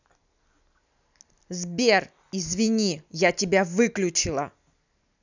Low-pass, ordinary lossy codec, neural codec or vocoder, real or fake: 7.2 kHz; none; none; real